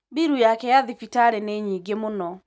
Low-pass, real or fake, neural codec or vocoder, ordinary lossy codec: none; real; none; none